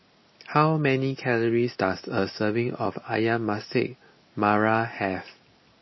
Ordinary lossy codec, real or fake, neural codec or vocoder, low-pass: MP3, 24 kbps; real; none; 7.2 kHz